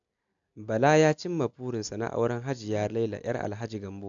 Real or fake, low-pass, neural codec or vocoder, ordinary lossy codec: real; 7.2 kHz; none; AAC, 64 kbps